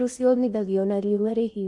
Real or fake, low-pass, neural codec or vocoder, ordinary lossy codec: fake; 10.8 kHz; codec, 16 kHz in and 24 kHz out, 0.6 kbps, FocalCodec, streaming, 4096 codes; none